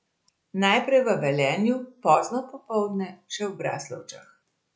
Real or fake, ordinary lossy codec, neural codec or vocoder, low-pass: real; none; none; none